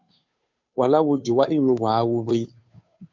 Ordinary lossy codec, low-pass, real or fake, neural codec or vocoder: MP3, 64 kbps; 7.2 kHz; fake; codec, 16 kHz, 2 kbps, FunCodec, trained on Chinese and English, 25 frames a second